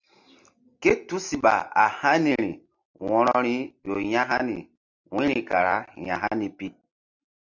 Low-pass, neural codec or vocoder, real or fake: 7.2 kHz; none; real